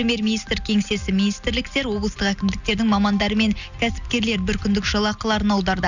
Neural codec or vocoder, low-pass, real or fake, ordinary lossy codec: none; 7.2 kHz; real; none